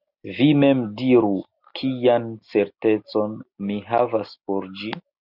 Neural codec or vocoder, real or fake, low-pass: none; real; 5.4 kHz